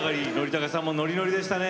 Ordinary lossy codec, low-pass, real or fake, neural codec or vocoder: none; none; real; none